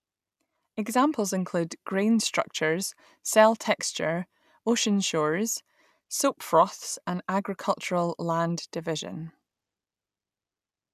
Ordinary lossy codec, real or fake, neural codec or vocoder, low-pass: none; real; none; 14.4 kHz